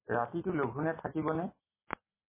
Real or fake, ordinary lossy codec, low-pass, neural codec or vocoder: real; MP3, 16 kbps; 3.6 kHz; none